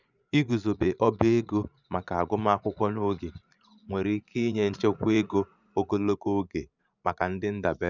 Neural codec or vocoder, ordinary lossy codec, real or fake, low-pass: vocoder, 44.1 kHz, 80 mel bands, Vocos; none; fake; 7.2 kHz